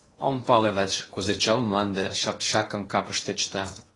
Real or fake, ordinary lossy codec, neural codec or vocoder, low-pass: fake; AAC, 32 kbps; codec, 16 kHz in and 24 kHz out, 0.8 kbps, FocalCodec, streaming, 65536 codes; 10.8 kHz